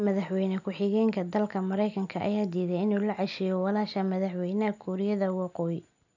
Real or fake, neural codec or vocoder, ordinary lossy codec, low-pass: real; none; none; 7.2 kHz